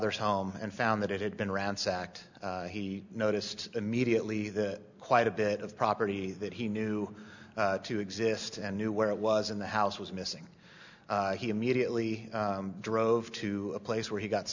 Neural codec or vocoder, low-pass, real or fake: none; 7.2 kHz; real